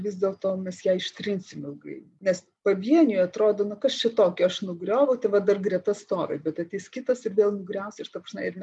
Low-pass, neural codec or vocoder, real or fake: 10.8 kHz; none; real